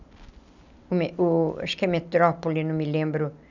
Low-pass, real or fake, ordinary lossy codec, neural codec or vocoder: 7.2 kHz; real; none; none